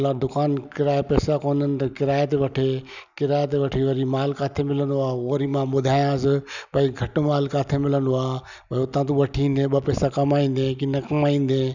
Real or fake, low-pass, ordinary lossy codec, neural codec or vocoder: real; 7.2 kHz; none; none